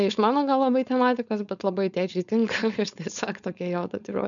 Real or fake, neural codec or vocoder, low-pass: fake; codec, 16 kHz, 4.8 kbps, FACodec; 7.2 kHz